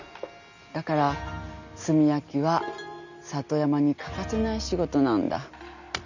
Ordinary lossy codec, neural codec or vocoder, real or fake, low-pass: none; none; real; 7.2 kHz